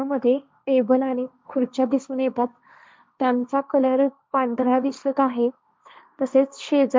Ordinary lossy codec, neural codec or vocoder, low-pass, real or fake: none; codec, 16 kHz, 1.1 kbps, Voila-Tokenizer; none; fake